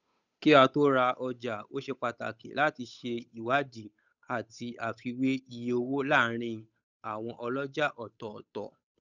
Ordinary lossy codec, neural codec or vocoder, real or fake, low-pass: none; codec, 16 kHz, 8 kbps, FunCodec, trained on Chinese and English, 25 frames a second; fake; 7.2 kHz